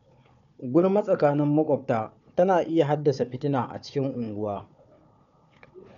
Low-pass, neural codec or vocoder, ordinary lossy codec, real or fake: 7.2 kHz; codec, 16 kHz, 4 kbps, FunCodec, trained on Chinese and English, 50 frames a second; none; fake